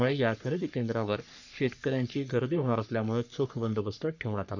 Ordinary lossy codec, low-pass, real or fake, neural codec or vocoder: none; 7.2 kHz; fake; codec, 44.1 kHz, 3.4 kbps, Pupu-Codec